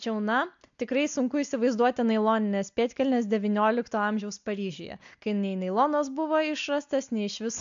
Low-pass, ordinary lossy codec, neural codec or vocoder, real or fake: 7.2 kHz; AAC, 64 kbps; none; real